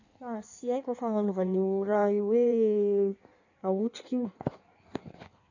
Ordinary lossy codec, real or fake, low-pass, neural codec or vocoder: none; fake; 7.2 kHz; codec, 16 kHz in and 24 kHz out, 1.1 kbps, FireRedTTS-2 codec